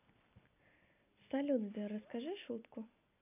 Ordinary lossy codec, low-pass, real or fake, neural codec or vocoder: none; 3.6 kHz; real; none